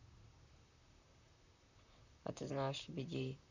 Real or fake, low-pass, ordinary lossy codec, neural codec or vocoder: fake; 7.2 kHz; none; vocoder, 44.1 kHz, 128 mel bands, Pupu-Vocoder